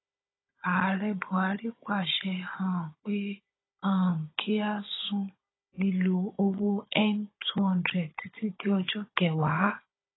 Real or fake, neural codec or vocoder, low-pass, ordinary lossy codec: fake; codec, 16 kHz, 16 kbps, FunCodec, trained on Chinese and English, 50 frames a second; 7.2 kHz; AAC, 16 kbps